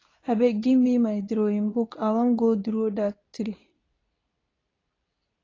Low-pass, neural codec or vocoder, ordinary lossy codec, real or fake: 7.2 kHz; codec, 24 kHz, 0.9 kbps, WavTokenizer, medium speech release version 2; AAC, 32 kbps; fake